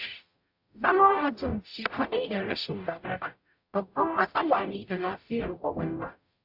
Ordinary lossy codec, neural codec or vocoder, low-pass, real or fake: AAC, 48 kbps; codec, 44.1 kHz, 0.9 kbps, DAC; 5.4 kHz; fake